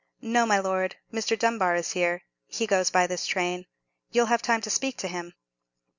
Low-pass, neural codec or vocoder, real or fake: 7.2 kHz; none; real